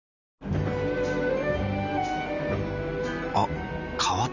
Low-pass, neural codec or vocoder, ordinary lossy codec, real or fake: 7.2 kHz; none; none; real